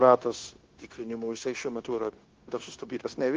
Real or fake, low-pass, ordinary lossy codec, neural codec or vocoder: fake; 7.2 kHz; Opus, 16 kbps; codec, 16 kHz, 0.9 kbps, LongCat-Audio-Codec